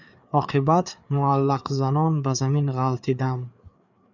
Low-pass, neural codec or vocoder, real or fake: 7.2 kHz; codec, 16 kHz, 4 kbps, FreqCodec, larger model; fake